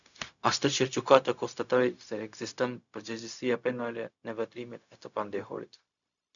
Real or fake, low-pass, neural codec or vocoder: fake; 7.2 kHz; codec, 16 kHz, 0.4 kbps, LongCat-Audio-Codec